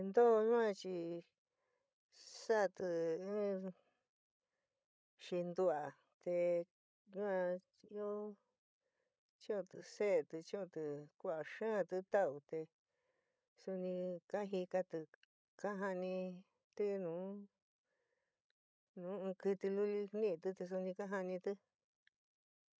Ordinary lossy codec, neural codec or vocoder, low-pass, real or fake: none; codec, 16 kHz, 16 kbps, FreqCodec, larger model; none; fake